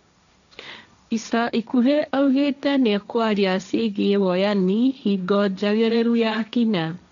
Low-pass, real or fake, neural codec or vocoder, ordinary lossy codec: 7.2 kHz; fake; codec, 16 kHz, 1.1 kbps, Voila-Tokenizer; none